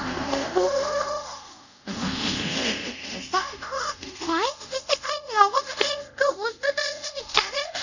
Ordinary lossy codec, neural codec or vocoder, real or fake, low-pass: none; codec, 24 kHz, 0.5 kbps, DualCodec; fake; 7.2 kHz